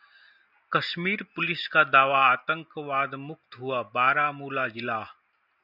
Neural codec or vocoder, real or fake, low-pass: none; real; 5.4 kHz